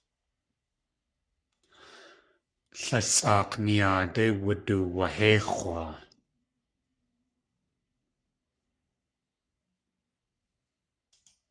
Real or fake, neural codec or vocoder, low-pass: fake; codec, 44.1 kHz, 3.4 kbps, Pupu-Codec; 9.9 kHz